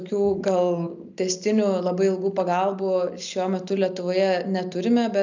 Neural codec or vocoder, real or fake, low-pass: none; real; 7.2 kHz